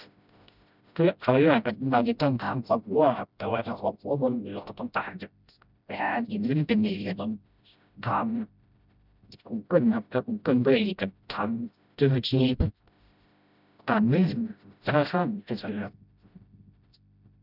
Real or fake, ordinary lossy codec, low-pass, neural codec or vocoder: fake; none; 5.4 kHz; codec, 16 kHz, 0.5 kbps, FreqCodec, smaller model